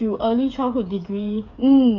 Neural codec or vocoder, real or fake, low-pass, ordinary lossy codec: codec, 16 kHz, 8 kbps, FreqCodec, smaller model; fake; 7.2 kHz; none